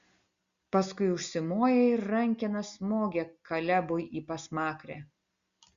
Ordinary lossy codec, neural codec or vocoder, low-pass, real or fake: Opus, 64 kbps; none; 7.2 kHz; real